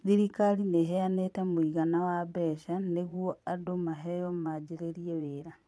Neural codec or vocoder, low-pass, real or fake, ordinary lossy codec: vocoder, 22.05 kHz, 80 mel bands, Vocos; none; fake; none